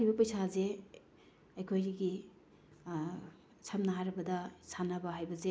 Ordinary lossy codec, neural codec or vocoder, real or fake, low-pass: none; none; real; none